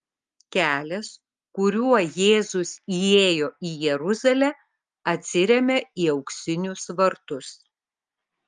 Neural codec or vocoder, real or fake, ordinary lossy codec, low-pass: none; real; Opus, 32 kbps; 10.8 kHz